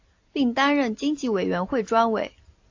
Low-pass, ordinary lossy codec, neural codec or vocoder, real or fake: 7.2 kHz; AAC, 48 kbps; none; real